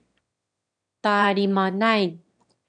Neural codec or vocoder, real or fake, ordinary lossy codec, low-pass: autoencoder, 22.05 kHz, a latent of 192 numbers a frame, VITS, trained on one speaker; fake; MP3, 64 kbps; 9.9 kHz